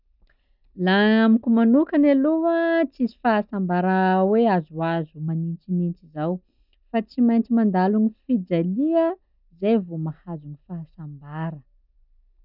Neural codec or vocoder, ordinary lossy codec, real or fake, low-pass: none; none; real; 5.4 kHz